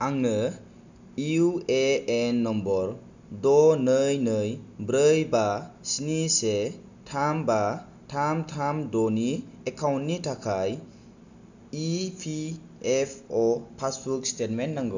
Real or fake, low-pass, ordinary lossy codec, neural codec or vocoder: real; 7.2 kHz; none; none